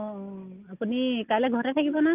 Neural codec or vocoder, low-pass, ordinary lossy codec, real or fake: none; 3.6 kHz; Opus, 24 kbps; real